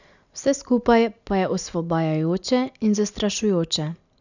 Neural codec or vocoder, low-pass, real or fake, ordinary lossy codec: none; 7.2 kHz; real; none